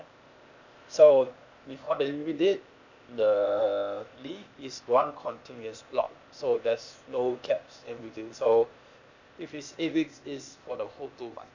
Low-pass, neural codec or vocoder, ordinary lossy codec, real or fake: 7.2 kHz; codec, 16 kHz, 0.8 kbps, ZipCodec; none; fake